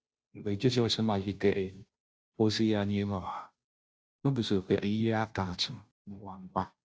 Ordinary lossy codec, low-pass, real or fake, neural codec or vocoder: none; none; fake; codec, 16 kHz, 0.5 kbps, FunCodec, trained on Chinese and English, 25 frames a second